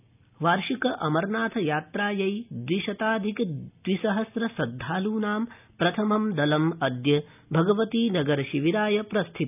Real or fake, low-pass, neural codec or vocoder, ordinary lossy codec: real; 3.6 kHz; none; none